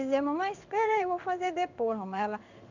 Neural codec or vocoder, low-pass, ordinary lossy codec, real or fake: codec, 16 kHz in and 24 kHz out, 1 kbps, XY-Tokenizer; 7.2 kHz; none; fake